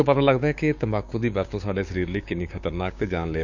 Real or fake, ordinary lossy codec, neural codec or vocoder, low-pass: fake; none; codec, 16 kHz, 6 kbps, DAC; 7.2 kHz